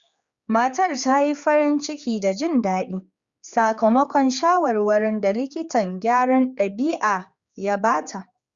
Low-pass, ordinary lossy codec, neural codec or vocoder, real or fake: 7.2 kHz; Opus, 64 kbps; codec, 16 kHz, 4 kbps, X-Codec, HuBERT features, trained on general audio; fake